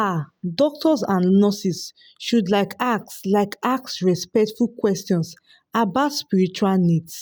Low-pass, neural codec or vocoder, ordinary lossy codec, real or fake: none; none; none; real